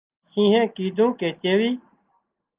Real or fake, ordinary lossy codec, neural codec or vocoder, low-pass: real; Opus, 32 kbps; none; 3.6 kHz